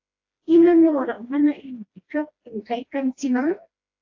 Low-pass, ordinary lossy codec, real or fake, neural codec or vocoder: 7.2 kHz; AAC, 48 kbps; fake; codec, 16 kHz, 1 kbps, FreqCodec, smaller model